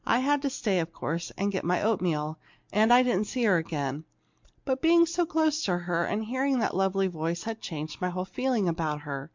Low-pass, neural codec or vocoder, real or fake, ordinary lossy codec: 7.2 kHz; none; real; MP3, 64 kbps